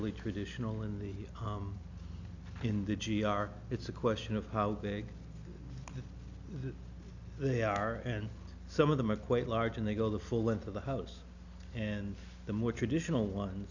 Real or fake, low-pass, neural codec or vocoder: real; 7.2 kHz; none